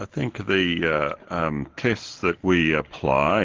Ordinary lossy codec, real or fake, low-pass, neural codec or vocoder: Opus, 16 kbps; real; 7.2 kHz; none